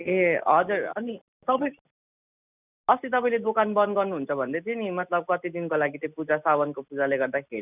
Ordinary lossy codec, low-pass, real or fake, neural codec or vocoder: none; 3.6 kHz; real; none